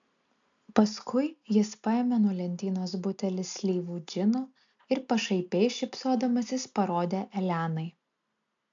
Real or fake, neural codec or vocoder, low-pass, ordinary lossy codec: real; none; 7.2 kHz; AAC, 64 kbps